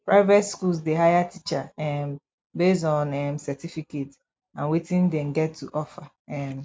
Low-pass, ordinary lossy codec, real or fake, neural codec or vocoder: none; none; real; none